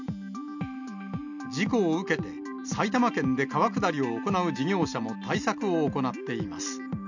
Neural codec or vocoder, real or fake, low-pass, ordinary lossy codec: none; real; 7.2 kHz; none